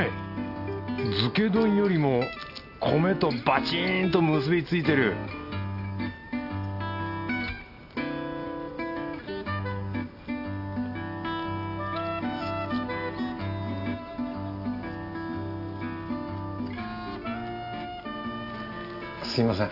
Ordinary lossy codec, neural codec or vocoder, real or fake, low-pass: none; none; real; 5.4 kHz